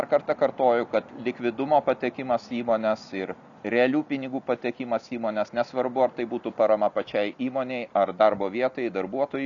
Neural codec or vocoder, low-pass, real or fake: none; 7.2 kHz; real